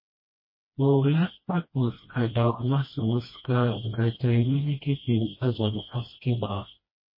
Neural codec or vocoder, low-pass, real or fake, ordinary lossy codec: codec, 16 kHz, 1 kbps, FreqCodec, smaller model; 5.4 kHz; fake; MP3, 24 kbps